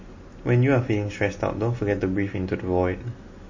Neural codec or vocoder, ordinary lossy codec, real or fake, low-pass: none; MP3, 32 kbps; real; 7.2 kHz